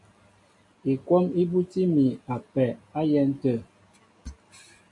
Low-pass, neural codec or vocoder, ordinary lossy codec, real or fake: 10.8 kHz; none; AAC, 64 kbps; real